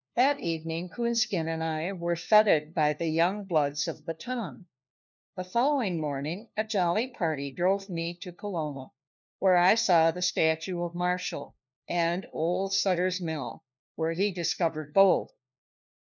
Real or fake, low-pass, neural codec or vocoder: fake; 7.2 kHz; codec, 16 kHz, 1 kbps, FunCodec, trained on LibriTTS, 50 frames a second